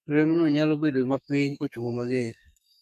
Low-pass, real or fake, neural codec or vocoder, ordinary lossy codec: 14.4 kHz; fake; codec, 32 kHz, 1.9 kbps, SNAC; none